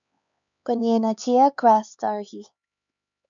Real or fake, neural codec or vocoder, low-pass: fake; codec, 16 kHz, 4 kbps, X-Codec, HuBERT features, trained on LibriSpeech; 7.2 kHz